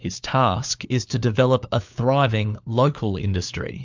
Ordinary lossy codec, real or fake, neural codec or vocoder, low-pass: MP3, 64 kbps; fake; codec, 16 kHz, 4 kbps, FunCodec, trained on LibriTTS, 50 frames a second; 7.2 kHz